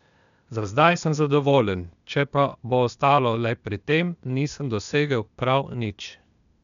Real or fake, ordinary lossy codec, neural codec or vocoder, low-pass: fake; none; codec, 16 kHz, 0.8 kbps, ZipCodec; 7.2 kHz